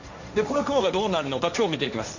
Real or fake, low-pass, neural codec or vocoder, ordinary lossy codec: fake; 7.2 kHz; codec, 16 kHz, 1.1 kbps, Voila-Tokenizer; none